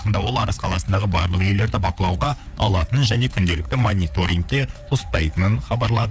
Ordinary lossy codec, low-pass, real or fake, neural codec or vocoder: none; none; fake; codec, 16 kHz, 4 kbps, FreqCodec, larger model